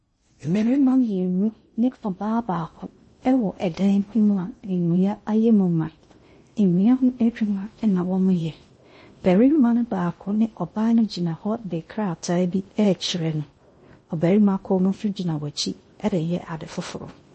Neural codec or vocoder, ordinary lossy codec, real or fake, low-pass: codec, 16 kHz in and 24 kHz out, 0.6 kbps, FocalCodec, streaming, 2048 codes; MP3, 32 kbps; fake; 10.8 kHz